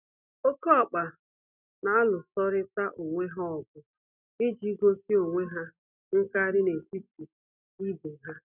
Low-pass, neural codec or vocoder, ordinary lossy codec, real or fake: 3.6 kHz; none; none; real